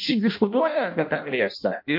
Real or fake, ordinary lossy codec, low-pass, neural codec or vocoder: fake; MP3, 32 kbps; 5.4 kHz; codec, 16 kHz in and 24 kHz out, 0.6 kbps, FireRedTTS-2 codec